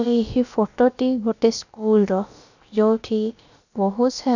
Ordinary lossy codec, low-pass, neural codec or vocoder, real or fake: none; 7.2 kHz; codec, 16 kHz, about 1 kbps, DyCAST, with the encoder's durations; fake